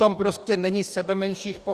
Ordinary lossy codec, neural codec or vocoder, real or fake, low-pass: Opus, 64 kbps; codec, 44.1 kHz, 2.6 kbps, DAC; fake; 14.4 kHz